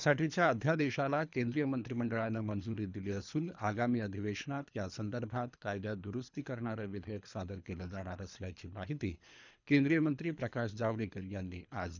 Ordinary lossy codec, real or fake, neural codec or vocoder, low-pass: none; fake; codec, 24 kHz, 3 kbps, HILCodec; 7.2 kHz